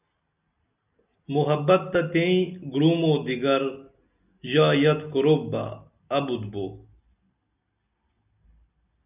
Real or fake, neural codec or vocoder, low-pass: real; none; 3.6 kHz